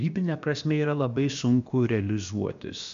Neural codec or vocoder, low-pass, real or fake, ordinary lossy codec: codec, 16 kHz, about 1 kbps, DyCAST, with the encoder's durations; 7.2 kHz; fake; MP3, 64 kbps